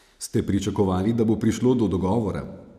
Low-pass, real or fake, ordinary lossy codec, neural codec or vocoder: 14.4 kHz; real; none; none